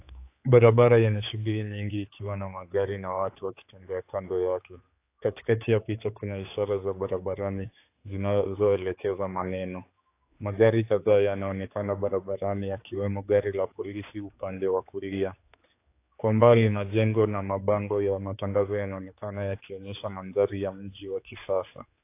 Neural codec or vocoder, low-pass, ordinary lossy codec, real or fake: codec, 16 kHz, 4 kbps, X-Codec, HuBERT features, trained on general audio; 3.6 kHz; AAC, 24 kbps; fake